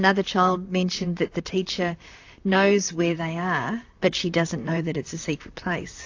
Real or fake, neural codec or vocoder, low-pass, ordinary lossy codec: fake; vocoder, 44.1 kHz, 128 mel bands, Pupu-Vocoder; 7.2 kHz; AAC, 48 kbps